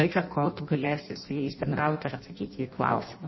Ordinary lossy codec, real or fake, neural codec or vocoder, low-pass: MP3, 24 kbps; fake; codec, 16 kHz in and 24 kHz out, 0.6 kbps, FireRedTTS-2 codec; 7.2 kHz